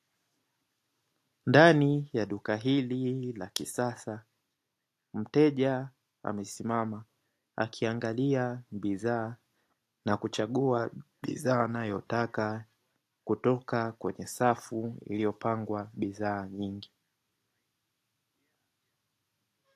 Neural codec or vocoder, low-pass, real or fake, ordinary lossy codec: none; 14.4 kHz; real; AAC, 64 kbps